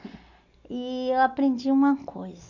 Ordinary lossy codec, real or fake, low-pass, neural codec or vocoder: none; real; 7.2 kHz; none